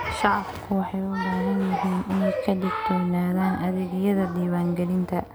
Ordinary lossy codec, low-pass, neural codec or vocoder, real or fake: none; none; none; real